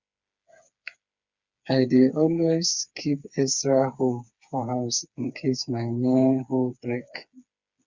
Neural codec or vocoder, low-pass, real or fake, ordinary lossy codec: codec, 16 kHz, 4 kbps, FreqCodec, smaller model; 7.2 kHz; fake; Opus, 64 kbps